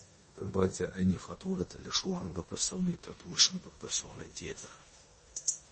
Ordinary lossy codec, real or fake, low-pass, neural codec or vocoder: MP3, 32 kbps; fake; 10.8 kHz; codec, 16 kHz in and 24 kHz out, 0.9 kbps, LongCat-Audio-Codec, four codebook decoder